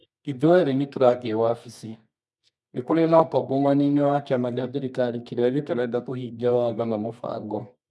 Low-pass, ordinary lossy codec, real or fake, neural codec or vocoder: none; none; fake; codec, 24 kHz, 0.9 kbps, WavTokenizer, medium music audio release